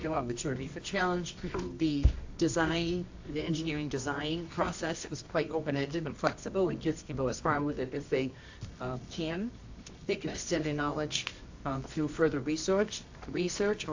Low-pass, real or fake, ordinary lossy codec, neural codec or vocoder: 7.2 kHz; fake; AAC, 48 kbps; codec, 24 kHz, 0.9 kbps, WavTokenizer, medium music audio release